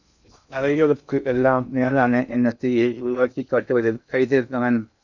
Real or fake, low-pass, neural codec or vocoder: fake; 7.2 kHz; codec, 16 kHz in and 24 kHz out, 0.6 kbps, FocalCodec, streaming, 2048 codes